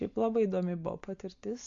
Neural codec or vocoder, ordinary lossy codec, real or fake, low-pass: none; MP3, 48 kbps; real; 7.2 kHz